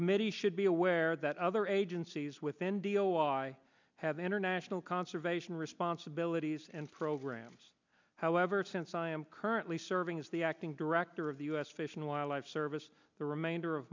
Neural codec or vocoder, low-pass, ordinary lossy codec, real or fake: none; 7.2 kHz; MP3, 64 kbps; real